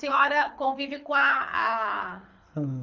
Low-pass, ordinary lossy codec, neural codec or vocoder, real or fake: 7.2 kHz; none; codec, 24 kHz, 6 kbps, HILCodec; fake